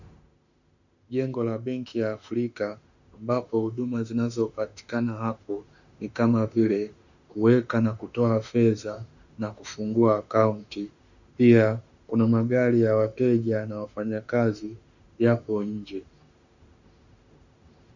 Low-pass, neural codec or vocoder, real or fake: 7.2 kHz; autoencoder, 48 kHz, 32 numbers a frame, DAC-VAE, trained on Japanese speech; fake